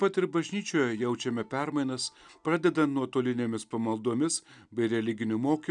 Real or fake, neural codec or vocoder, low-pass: real; none; 9.9 kHz